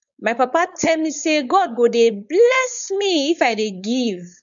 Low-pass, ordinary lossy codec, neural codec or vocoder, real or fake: 7.2 kHz; none; codec, 16 kHz, 4.8 kbps, FACodec; fake